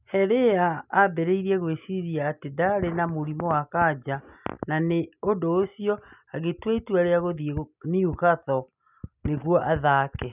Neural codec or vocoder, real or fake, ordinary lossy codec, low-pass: none; real; none; 3.6 kHz